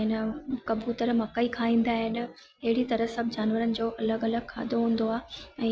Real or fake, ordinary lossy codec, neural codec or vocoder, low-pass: real; none; none; none